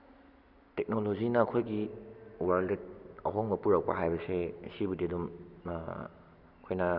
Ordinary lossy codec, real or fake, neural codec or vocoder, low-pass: none; real; none; 5.4 kHz